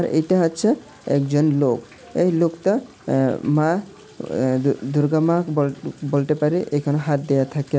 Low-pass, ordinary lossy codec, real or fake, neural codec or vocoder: none; none; real; none